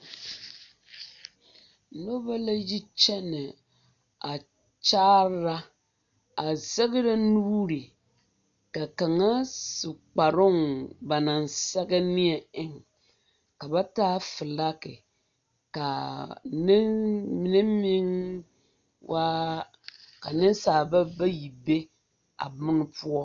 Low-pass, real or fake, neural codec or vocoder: 7.2 kHz; real; none